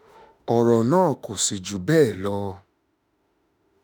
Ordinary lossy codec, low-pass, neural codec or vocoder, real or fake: none; none; autoencoder, 48 kHz, 32 numbers a frame, DAC-VAE, trained on Japanese speech; fake